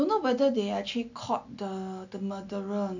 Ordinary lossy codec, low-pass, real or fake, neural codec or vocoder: none; 7.2 kHz; real; none